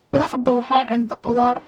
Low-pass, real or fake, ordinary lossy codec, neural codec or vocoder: 19.8 kHz; fake; MP3, 96 kbps; codec, 44.1 kHz, 0.9 kbps, DAC